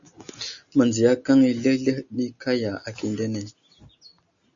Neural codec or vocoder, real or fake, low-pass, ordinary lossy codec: none; real; 7.2 kHz; AAC, 64 kbps